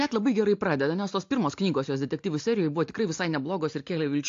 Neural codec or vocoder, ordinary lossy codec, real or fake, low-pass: none; AAC, 48 kbps; real; 7.2 kHz